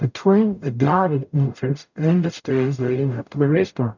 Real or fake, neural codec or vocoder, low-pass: fake; codec, 44.1 kHz, 0.9 kbps, DAC; 7.2 kHz